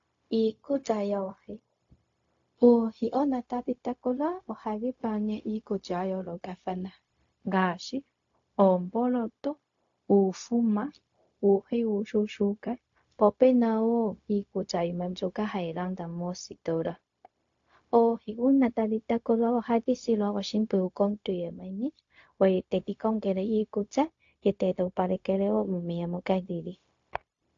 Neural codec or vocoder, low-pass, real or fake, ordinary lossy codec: codec, 16 kHz, 0.4 kbps, LongCat-Audio-Codec; 7.2 kHz; fake; AAC, 48 kbps